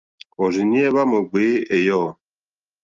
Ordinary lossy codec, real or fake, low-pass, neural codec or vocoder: Opus, 24 kbps; real; 7.2 kHz; none